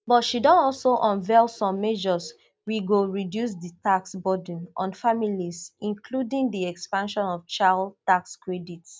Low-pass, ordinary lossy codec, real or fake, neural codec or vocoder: none; none; real; none